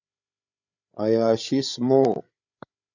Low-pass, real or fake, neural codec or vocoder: 7.2 kHz; fake; codec, 16 kHz, 8 kbps, FreqCodec, larger model